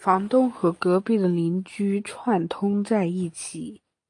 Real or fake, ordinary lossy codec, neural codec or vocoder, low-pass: real; AAC, 48 kbps; none; 10.8 kHz